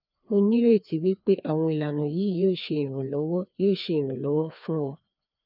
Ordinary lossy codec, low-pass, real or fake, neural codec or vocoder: none; 5.4 kHz; fake; codec, 16 kHz, 2 kbps, FreqCodec, larger model